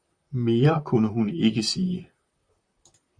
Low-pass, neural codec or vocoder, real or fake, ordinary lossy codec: 9.9 kHz; vocoder, 44.1 kHz, 128 mel bands, Pupu-Vocoder; fake; Opus, 64 kbps